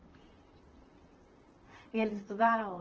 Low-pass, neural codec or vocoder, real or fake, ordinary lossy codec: 7.2 kHz; vocoder, 44.1 kHz, 128 mel bands, Pupu-Vocoder; fake; Opus, 16 kbps